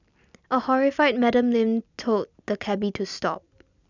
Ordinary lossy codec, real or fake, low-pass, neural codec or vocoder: none; real; 7.2 kHz; none